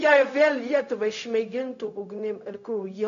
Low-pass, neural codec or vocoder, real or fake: 7.2 kHz; codec, 16 kHz, 0.4 kbps, LongCat-Audio-Codec; fake